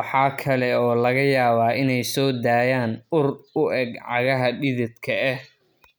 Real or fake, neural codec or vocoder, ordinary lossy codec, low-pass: real; none; none; none